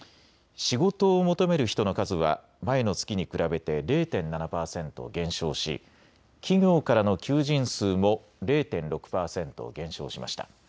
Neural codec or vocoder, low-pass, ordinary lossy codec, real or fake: none; none; none; real